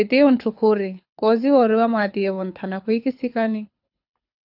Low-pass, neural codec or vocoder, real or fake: 5.4 kHz; codec, 24 kHz, 6 kbps, HILCodec; fake